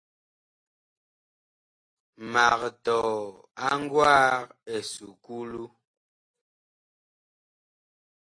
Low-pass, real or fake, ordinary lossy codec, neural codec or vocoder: 9.9 kHz; real; AAC, 32 kbps; none